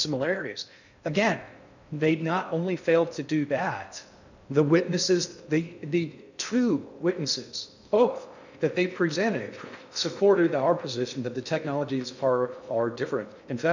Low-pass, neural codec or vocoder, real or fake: 7.2 kHz; codec, 16 kHz in and 24 kHz out, 0.6 kbps, FocalCodec, streaming, 2048 codes; fake